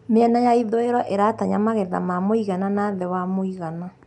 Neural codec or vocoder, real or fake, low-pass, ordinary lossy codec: none; real; 10.8 kHz; none